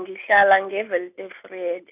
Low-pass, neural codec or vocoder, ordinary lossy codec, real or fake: 3.6 kHz; none; none; real